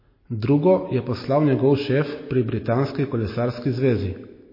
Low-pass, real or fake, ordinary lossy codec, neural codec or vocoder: 5.4 kHz; real; MP3, 24 kbps; none